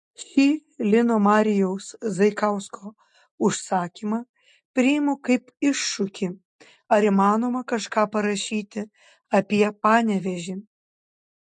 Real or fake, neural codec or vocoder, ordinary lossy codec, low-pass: real; none; MP3, 48 kbps; 10.8 kHz